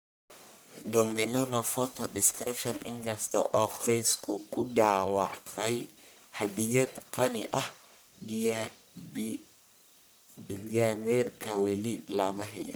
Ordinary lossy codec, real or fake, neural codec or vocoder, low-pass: none; fake; codec, 44.1 kHz, 1.7 kbps, Pupu-Codec; none